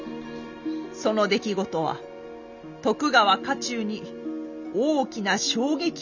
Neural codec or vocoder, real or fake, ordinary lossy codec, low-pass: none; real; none; 7.2 kHz